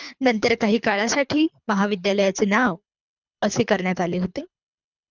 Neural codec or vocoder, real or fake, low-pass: codec, 24 kHz, 3 kbps, HILCodec; fake; 7.2 kHz